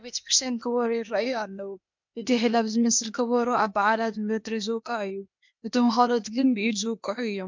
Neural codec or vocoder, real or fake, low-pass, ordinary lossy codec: codec, 16 kHz, 0.8 kbps, ZipCodec; fake; 7.2 kHz; MP3, 64 kbps